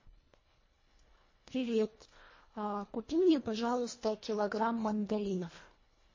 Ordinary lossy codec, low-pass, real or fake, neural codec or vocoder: MP3, 32 kbps; 7.2 kHz; fake; codec, 24 kHz, 1.5 kbps, HILCodec